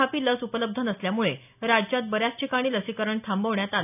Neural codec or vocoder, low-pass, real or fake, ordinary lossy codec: none; 3.6 kHz; real; none